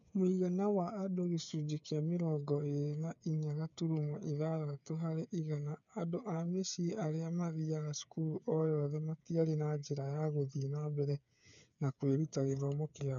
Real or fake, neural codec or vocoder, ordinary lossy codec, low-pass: fake; codec, 16 kHz, 8 kbps, FreqCodec, smaller model; none; 7.2 kHz